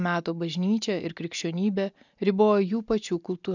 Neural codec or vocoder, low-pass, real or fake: none; 7.2 kHz; real